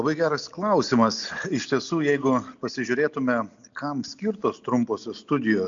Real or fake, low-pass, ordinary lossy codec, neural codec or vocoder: real; 7.2 kHz; MP3, 64 kbps; none